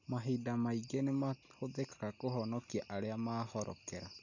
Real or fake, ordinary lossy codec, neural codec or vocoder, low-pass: real; none; none; 7.2 kHz